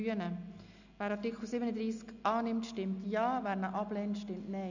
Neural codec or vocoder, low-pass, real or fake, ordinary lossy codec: none; 7.2 kHz; real; none